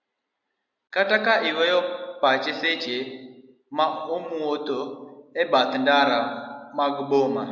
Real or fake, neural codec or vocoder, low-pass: real; none; 7.2 kHz